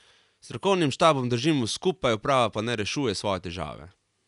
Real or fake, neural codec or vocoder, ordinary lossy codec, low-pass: real; none; none; 10.8 kHz